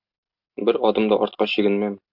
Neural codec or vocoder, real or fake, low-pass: none; real; 5.4 kHz